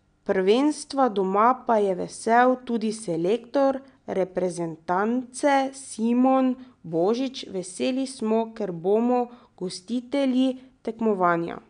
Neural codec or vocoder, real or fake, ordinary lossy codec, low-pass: none; real; none; 9.9 kHz